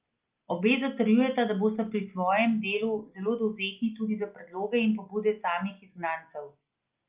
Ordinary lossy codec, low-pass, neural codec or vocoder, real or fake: Opus, 32 kbps; 3.6 kHz; none; real